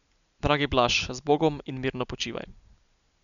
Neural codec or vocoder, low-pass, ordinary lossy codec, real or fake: none; 7.2 kHz; none; real